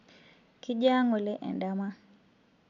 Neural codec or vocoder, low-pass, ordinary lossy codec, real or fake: none; 7.2 kHz; none; real